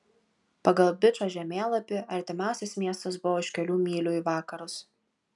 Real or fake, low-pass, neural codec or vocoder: real; 10.8 kHz; none